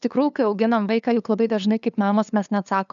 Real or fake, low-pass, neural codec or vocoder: fake; 7.2 kHz; codec, 16 kHz, 2 kbps, FunCodec, trained on LibriTTS, 25 frames a second